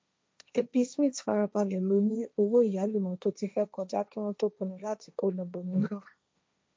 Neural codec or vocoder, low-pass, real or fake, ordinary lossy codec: codec, 16 kHz, 1.1 kbps, Voila-Tokenizer; none; fake; none